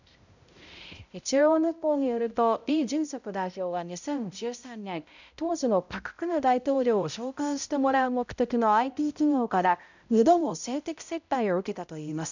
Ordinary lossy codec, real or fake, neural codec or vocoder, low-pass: none; fake; codec, 16 kHz, 0.5 kbps, X-Codec, HuBERT features, trained on balanced general audio; 7.2 kHz